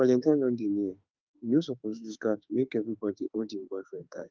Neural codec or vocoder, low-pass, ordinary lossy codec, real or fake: autoencoder, 48 kHz, 32 numbers a frame, DAC-VAE, trained on Japanese speech; 7.2 kHz; Opus, 24 kbps; fake